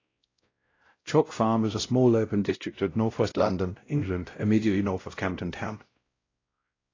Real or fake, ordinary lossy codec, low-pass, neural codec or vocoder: fake; AAC, 32 kbps; 7.2 kHz; codec, 16 kHz, 0.5 kbps, X-Codec, WavLM features, trained on Multilingual LibriSpeech